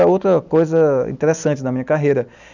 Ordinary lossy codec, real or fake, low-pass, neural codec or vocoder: none; real; 7.2 kHz; none